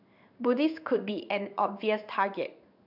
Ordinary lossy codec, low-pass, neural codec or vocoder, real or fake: none; 5.4 kHz; codec, 16 kHz in and 24 kHz out, 1 kbps, XY-Tokenizer; fake